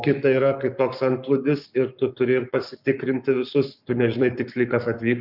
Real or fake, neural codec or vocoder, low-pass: fake; codec, 44.1 kHz, 7.8 kbps, Pupu-Codec; 5.4 kHz